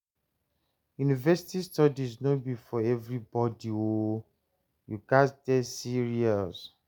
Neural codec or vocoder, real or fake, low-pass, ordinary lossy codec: none; real; none; none